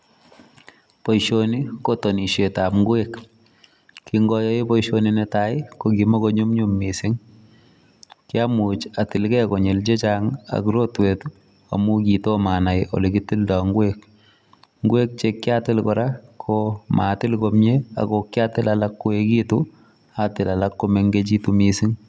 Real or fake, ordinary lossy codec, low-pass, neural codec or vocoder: real; none; none; none